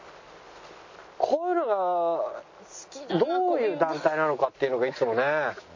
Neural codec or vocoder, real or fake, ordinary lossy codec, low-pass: none; real; MP3, 32 kbps; 7.2 kHz